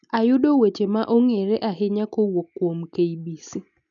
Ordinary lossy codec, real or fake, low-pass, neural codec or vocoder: none; real; 7.2 kHz; none